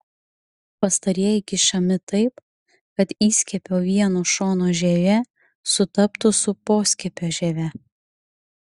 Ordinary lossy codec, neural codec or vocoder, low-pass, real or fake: Opus, 64 kbps; none; 10.8 kHz; real